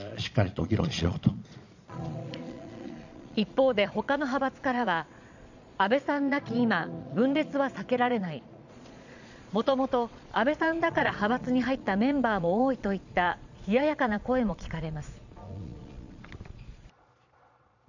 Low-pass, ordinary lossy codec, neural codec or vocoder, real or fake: 7.2 kHz; none; vocoder, 22.05 kHz, 80 mel bands, Vocos; fake